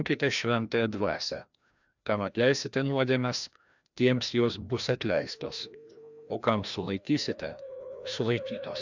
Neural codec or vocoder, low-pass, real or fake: codec, 16 kHz, 1 kbps, FreqCodec, larger model; 7.2 kHz; fake